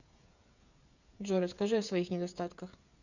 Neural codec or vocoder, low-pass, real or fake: codec, 16 kHz, 8 kbps, FreqCodec, smaller model; 7.2 kHz; fake